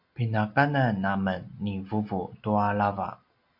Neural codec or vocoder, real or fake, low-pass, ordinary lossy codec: none; real; 5.4 kHz; MP3, 48 kbps